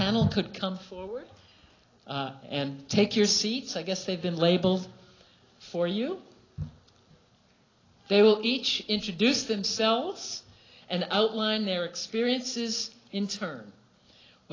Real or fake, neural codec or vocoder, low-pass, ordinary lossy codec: real; none; 7.2 kHz; AAC, 32 kbps